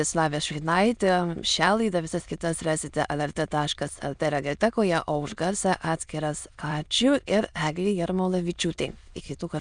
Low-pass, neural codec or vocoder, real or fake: 9.9 kHz; autoencoder, 22.05 kHz, a latent of 192 numbers a frame, VITS, trained on many speakers; fake